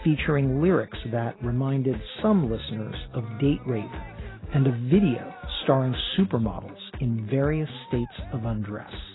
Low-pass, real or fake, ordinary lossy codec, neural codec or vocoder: 7.2 kHz; real; AAC, 16 kbps; none